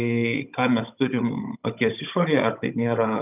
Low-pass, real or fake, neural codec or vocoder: 3.6 kHz; fake; codec, 16 kHz, 16 kbps, FunCodec, trained on Chinese and English, 50 frames a second